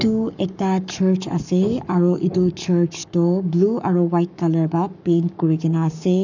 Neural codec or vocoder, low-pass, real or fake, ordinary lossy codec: codec, 44.1 kHz, 7.8 kbps, Pupu-Codec; 7.2 kHz; fake; none